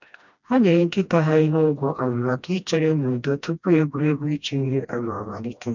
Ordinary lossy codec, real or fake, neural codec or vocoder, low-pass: none; fake; codec, 16 kHz, 1 kbps, FreqCodec, smaller model; 7.2 kHz